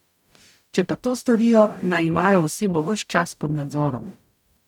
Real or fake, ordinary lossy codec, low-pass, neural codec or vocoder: fake; none; 19.8 kHz; codec, 44.1 kHz, 0.9 kbps, DAC